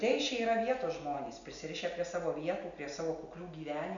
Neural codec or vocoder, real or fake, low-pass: none; real; 7.2 kHz